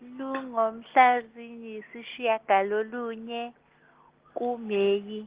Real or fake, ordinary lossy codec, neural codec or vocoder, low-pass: real; Opus, 16 kbps; none; 3.6 kHz